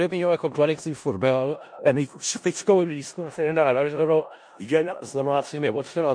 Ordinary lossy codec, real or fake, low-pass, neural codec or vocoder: MP3, 48 kbps; fake; 9.9 kHz; codec, 16 kHz in and 24 kHz out, 0.4 kbps, LongCat-Audio-Codec, four codebook decoder